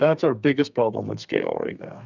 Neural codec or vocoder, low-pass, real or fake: codec, 32 kHz, 1.9 kbps, SNAC; 7.2 kHz; fake